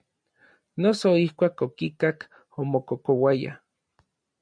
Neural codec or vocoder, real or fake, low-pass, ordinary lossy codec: none; real; 9.9 kHz; MP3, 96 kbps